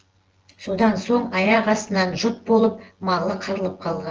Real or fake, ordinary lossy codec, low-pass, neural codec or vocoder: fake; Opus, 16 kbps; 7.2 kHz; vocoder, 24 kHz, 100 mel bands, Vocos